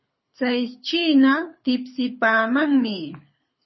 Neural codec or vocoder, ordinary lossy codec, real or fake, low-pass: codec, 24 kHz, 6 kbps, HILCodec; MP3, 24 kbps; fake; 7.2 kHz